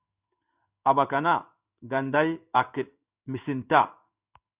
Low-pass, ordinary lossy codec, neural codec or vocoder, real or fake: 3.6 kHz; Opus, 64 kbps; codec, 16 kHz in and 24 kHz out, 1 kbps, XY-Tokenizer; fake